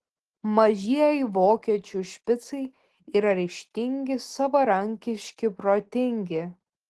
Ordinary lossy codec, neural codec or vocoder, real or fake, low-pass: Opus, 16 kbps; none; real; 10.8 kHz